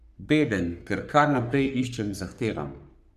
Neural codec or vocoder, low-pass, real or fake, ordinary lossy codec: codec, 44.1 kHz, 3.4 kbps, Pupu-Codec; 14.4 kHz; fake; none